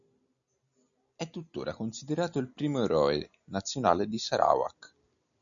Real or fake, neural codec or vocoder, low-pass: real; none; 7.2 kHz